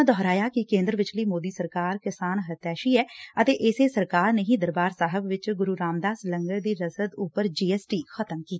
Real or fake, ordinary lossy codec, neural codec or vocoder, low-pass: real; none; none; none